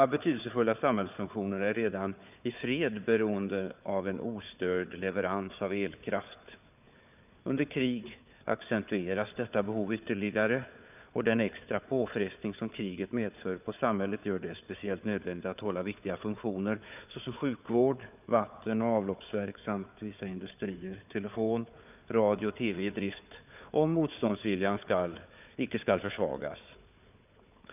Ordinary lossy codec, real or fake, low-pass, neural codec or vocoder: none; fake; 3.6 kHz; codec, 16 kHz, 4 kbps, FunCodec, trained on Chinese and English, 50 frames a second